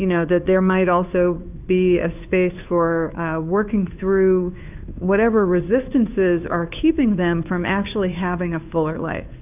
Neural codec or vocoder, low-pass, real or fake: codec, 16 kHz, 2 kbps, FunCodec, trained on Chinese and English, 25 frames a second; 3.6 kHz; fake